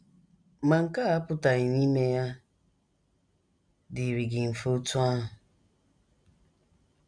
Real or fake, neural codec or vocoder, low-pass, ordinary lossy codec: real; none; 9.9 kHz; none